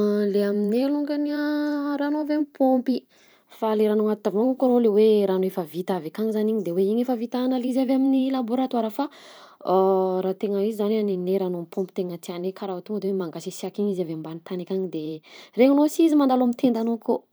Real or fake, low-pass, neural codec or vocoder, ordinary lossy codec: fake; none; vocoder, 44.1 kHz, 128 mel bands every 512 samples, BigVGAN v2; none